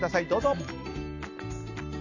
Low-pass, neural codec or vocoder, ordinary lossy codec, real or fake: 7.2 kHz; none; none; real